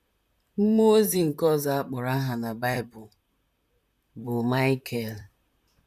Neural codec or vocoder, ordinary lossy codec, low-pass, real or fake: vocoder, 44.1 kHz, 128 mel bands, Pupu-Vocoder; none; 14.4 kHz; fake